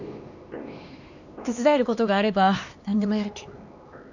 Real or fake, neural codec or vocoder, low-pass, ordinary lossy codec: fake; codec, 16 kHz, 2 kbps, X-Codec, HuBERT features, trained on LibriSpeech; 7.2 kHz; none